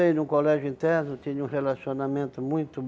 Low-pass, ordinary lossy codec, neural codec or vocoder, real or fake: none; none; none; real